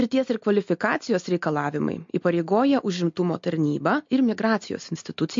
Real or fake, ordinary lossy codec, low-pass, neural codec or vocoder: real; MP3, 48 kbps; 7.2 kHz; none